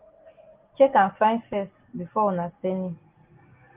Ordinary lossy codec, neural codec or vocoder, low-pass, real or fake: Opus, 32 kbps; none; 3.6 kHz; real